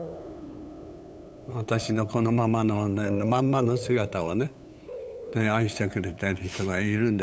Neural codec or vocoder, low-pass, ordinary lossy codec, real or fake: codec, 16 kHz, 8 kbps, FunCodec, trained on LibriTTS, 25 frames a second; none; none; fake